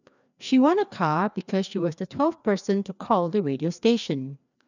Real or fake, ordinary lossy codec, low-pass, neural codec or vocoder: fake; none; 7.2 kHz; codec, 16 kHz, 2 kbps, FreqCodec, larger model